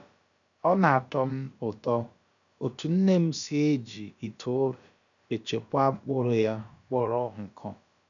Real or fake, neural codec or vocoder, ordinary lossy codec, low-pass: fake; codec, 16 kHz, about 1 kbps, DyCAST, with the encoder's durations; none; 7.2 kHz